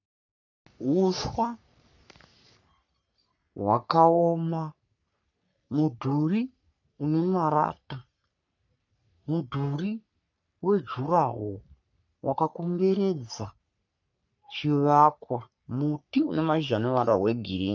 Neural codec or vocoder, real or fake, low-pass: codec, 44.1 kHz, 3.4 kbps, Pupu-Codec; fake; 7.2 kHz